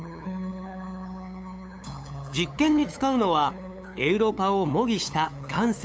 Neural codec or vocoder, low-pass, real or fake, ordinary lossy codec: codec, 16 kHz, 8 kbps, FunCodec, trained on LibriTTS, 25 frames a second; none; fake; none